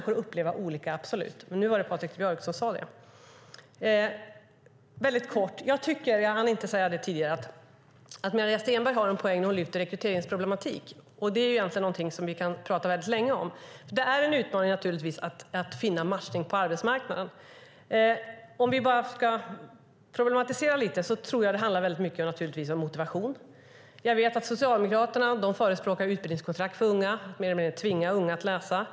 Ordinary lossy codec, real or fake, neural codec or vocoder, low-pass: none; real; none; none